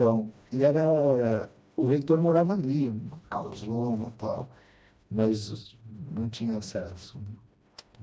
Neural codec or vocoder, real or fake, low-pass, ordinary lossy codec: codec, 16 kHz, 1 kbps, FreqCodec, smaller model; fake; none; none